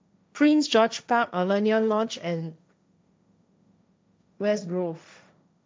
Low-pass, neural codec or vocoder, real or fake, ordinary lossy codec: none; codec, 16 kHz, 1.1 kbps, Voila-Tokenizer; fake; none